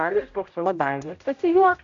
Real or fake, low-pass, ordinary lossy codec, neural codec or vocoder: fake; 7.2 kHz; AAC, 48 kbps; codec, 16 kHz, 0.5 kbps, X-Codec, HuBERT features, trained on general audio